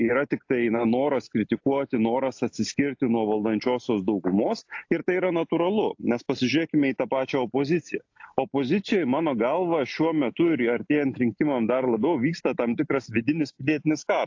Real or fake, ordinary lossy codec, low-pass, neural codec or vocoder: real; AAC, 48 kbps; 7.2 kHz; none